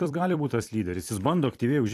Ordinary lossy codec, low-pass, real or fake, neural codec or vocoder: AAC, 64 kbps; 14.4 kHz; fake; vocoder, 44.1 kHz, 128 mel bands every 256 samples, BigVGAN v2